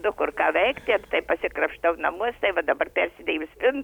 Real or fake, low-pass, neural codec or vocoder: real; 19.8 kHz; none